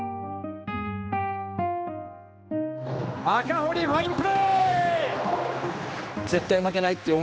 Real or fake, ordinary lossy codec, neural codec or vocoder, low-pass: fake; none; codec, 16 kHz, 2 kbps, X-Codec, HuBERT features, trained on general audio; none